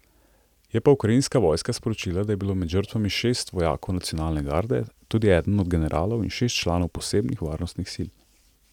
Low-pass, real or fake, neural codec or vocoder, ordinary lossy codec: 19.8 kHz; real; none; none